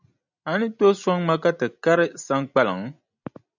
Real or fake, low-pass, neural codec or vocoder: real; 7.2 kHz; none